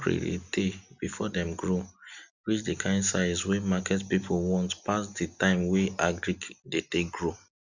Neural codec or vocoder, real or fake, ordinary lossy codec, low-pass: none; real; none; 7.2 kHz